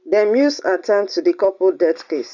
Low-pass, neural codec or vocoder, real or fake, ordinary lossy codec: 7.2 kHz; none; real; none